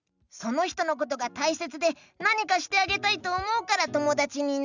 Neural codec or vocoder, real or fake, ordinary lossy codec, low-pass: none; real; none; 7.2 kHz